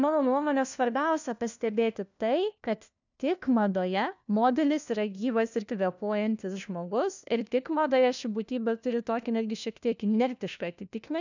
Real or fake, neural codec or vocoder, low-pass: fake; codec, 16 kHz, 1 kbps, FunCodec, trained on LibriTTS, 50 frames a second; 7.2 kHz